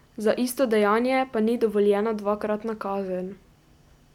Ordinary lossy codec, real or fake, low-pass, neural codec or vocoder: none; real; 19.8 kHz; none